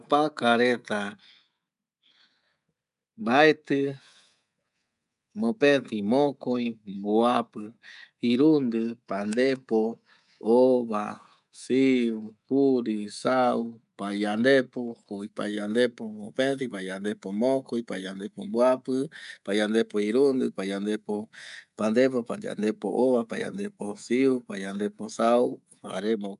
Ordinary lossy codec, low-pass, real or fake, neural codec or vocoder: none; 10.8 kHz; fake; codec, 24 kHz, 3.1 kbps, DualCodec